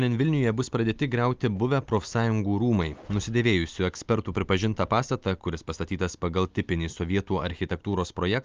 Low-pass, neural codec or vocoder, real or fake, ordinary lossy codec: 7.2 kHz; none; real; Opus, 24 kbps